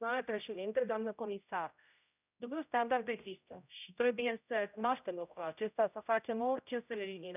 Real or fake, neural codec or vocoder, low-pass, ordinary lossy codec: fake; codec, 16 kHz, 0.5 kbps, X-Codec, HuBERT features, trained on general audio; 3.6 kHz; none